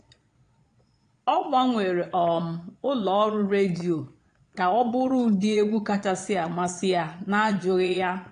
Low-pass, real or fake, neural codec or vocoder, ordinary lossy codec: 9.9 kHz; fake; vocoder, 22.05 kHz, 80 mel bands, Vocos; AAC, 48 kbps